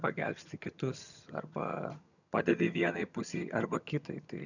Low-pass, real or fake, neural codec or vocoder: 7.2 kHz; fake; vocoder, 22.05 kHz, 80 mel bands, HiFi-GAN